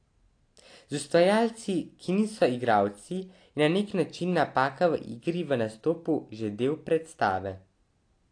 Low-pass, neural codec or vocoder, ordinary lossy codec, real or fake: 9.9 kHz; none; AAC, 48 kbps; real